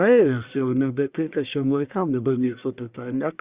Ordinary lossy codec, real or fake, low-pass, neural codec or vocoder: Opus, 64 kbps; fake; 3.6 kHz; codec, 44.1 kHz, 1.7 kbps, Pupu-Codec